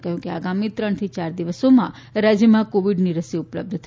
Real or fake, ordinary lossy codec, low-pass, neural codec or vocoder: real; none; none; none